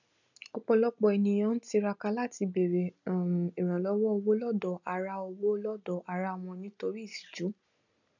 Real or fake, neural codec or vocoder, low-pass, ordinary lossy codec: real; none; 7.2 kHz; none